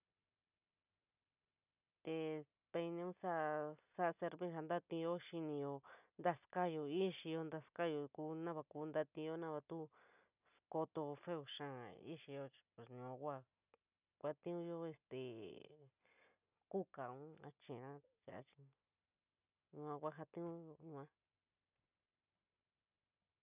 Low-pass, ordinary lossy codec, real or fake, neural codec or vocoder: 3.6 kHz; none; real; none